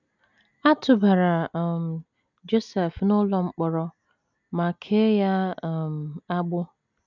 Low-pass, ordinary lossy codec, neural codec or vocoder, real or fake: 7.2 kHz; none; none; real